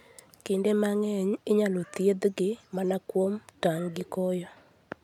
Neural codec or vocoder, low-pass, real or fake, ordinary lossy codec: none; 19.8 kHz; real; none